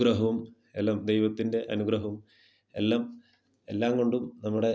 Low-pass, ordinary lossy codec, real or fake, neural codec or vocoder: none; none; real; none